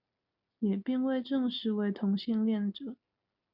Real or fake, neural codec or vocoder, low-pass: real; none; 5.4 kHz